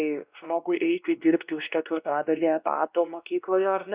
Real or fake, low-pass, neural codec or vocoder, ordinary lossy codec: fake; 3.6 kHz; codec, 16 kHz, 1 kbps, X-Codec, WavLM features, trained on Multilingual LibriSpeech; Opus, 64 kbps